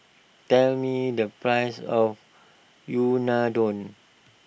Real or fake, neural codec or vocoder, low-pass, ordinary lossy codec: real; none; none; none